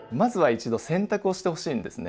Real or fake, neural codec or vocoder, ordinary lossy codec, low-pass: real; none; none; none